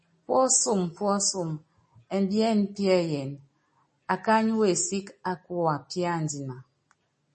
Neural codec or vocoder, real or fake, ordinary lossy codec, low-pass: none; real; MP3, 32 kbps; 10.8 kHz